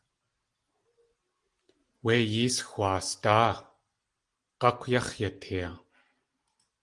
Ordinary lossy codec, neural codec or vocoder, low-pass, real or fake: Opus, 24 kbps; none; 10.8 kHz; real